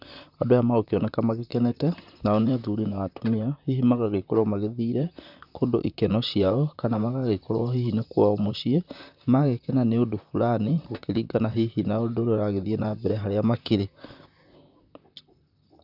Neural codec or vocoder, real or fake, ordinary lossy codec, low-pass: vocoder, 44.1 kHz, 128 mel bands, Pupu-Vocoder; fake; none; 5.4 kHz